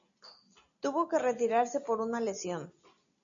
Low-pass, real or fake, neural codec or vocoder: 7.2 kHz; real; none